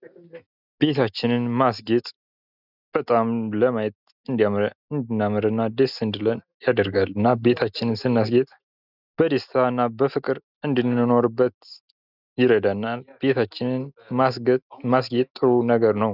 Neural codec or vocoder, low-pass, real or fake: none; 5.4 kHz; real